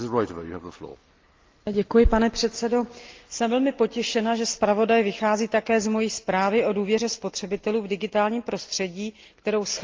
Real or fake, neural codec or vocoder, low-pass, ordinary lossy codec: real; none; 7.2 kHz; Opus, 32 kbps